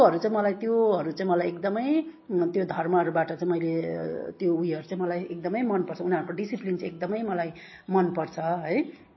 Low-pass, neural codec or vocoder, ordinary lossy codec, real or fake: 7.2 kHz; none; MP3, 24 kbps; real